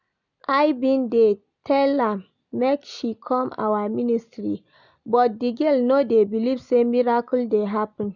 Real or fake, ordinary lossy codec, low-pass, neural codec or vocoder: real; none; 7.2 kHz; none